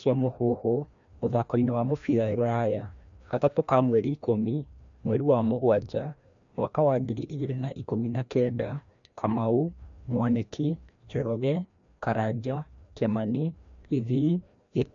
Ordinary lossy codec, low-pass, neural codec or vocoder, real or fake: MP3, 64 kbps; 7.2 kHz; codec, 16 kHz, 1 kbps, FreqCodec, larger model; fake